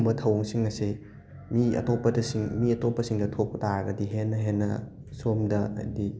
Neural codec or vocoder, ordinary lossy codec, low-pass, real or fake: none; none; none; real